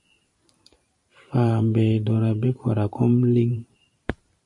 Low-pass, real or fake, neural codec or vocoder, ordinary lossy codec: 10.8 kHz; fake; vocoder, 44.1 kHz, 128 mel bands every 512 samples, BigVGAN v2; MP3, 48 kbps